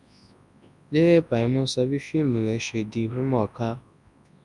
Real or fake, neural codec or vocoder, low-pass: fake; codec, 24 kHz, 0.9 kbps, WavTokenizer, large speech release; 10.8 kHz